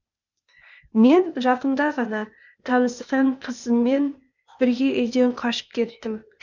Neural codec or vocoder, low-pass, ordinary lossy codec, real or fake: codec, 16 kHz, 0.8 kbps, ZipCodec; 7.2 kHz; none; fake